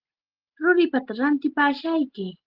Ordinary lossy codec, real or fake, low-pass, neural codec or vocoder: Opus, 16 kbps; real; 5.4 kHz; none